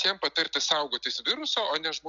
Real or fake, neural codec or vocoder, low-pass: real; none; 7.2 kHz